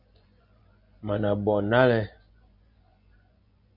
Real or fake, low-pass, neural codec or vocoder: fake; 5.4 kHz; vocoder, 24 kHz, 100 mel bands, Vocos